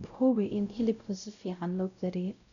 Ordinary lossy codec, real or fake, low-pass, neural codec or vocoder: none; fake; 7.2 kHz; codec, 16 kHz, 0.5 kbps, X-Codec, WavLM features, trained on Multilingual LibriSpeech